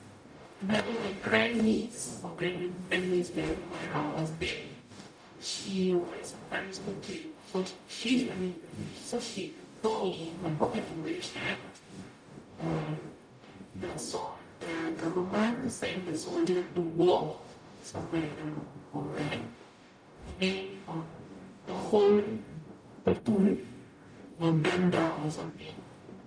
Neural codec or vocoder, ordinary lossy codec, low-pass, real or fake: codec, 44.1 kHz, 0.9 kbps, DAC; none; 9.9 kHz; fake